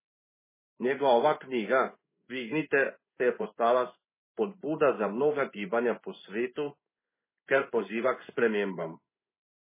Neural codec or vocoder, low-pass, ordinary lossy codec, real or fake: codec, 16 kHz in and 24 kHz out, 1 kbps, XY-Tokenizer; 3.6 kHz; MP3, 16 kbps; fake